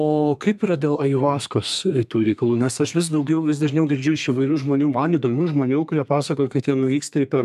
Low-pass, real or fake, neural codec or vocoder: 14.4 kHz; fake; codec, 32 kHz, 1.9 kbps, SNAC